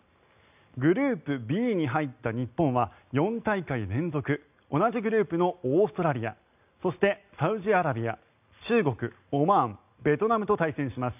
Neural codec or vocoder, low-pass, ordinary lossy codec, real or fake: none; 3.6 kHz; none; real